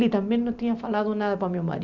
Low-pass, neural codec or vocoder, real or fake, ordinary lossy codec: 7.2 kHz; none; real; none